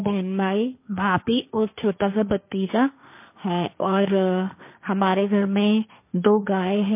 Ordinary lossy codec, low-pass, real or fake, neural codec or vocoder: MP3, 24 kbps; 3.6 kHz; fake; codec, 16 kHz, 1.1 kbps, Voila-Tokenizer